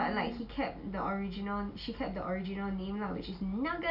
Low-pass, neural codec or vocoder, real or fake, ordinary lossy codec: 5.4 kHz; none; real; none